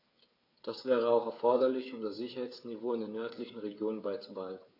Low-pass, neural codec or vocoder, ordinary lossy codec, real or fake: 5.4 kHz; codec, 16 kHz, 8 kbps, FreqCodec, smaller model; AAC, 32 kbps; fake